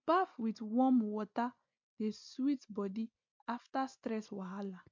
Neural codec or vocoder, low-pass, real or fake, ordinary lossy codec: none; 7.2 kHz; real; MP3, 64 kbps